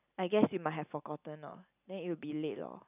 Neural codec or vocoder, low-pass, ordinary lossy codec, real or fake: none; 3.6 kHz; none; real